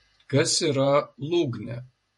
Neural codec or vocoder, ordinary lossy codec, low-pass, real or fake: vocoder, 48 kHz, 128 mel bands, Vocos; MP3, 48 kbps; 14.4 kHz; fake